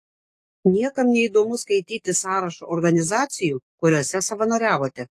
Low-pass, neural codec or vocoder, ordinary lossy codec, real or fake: 14.4 kHz; codec, 44.1 kHz, 7.8 kbps, DAC; AAC, 48 kbps; fake